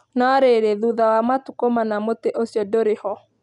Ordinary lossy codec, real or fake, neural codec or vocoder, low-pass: none; real; none; 10.8 kHz